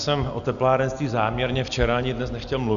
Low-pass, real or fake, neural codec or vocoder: 7.2 kHz; real; none